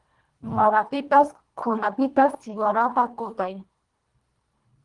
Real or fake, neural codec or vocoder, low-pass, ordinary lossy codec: fake; codec, 24 kHz, 1.5 kbps, HILCodec; 10.8 kHz; Opus, 24 kbps